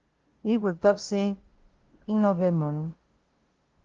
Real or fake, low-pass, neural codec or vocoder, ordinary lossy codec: fake; 7.2 kHz; codec, 16 kHz, 0.5 kbps, FunCodec, trained on LibriTTS, 25 frames a second; Opus, 16 kbps